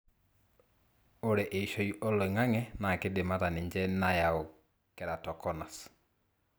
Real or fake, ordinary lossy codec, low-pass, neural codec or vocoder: real; none; none; none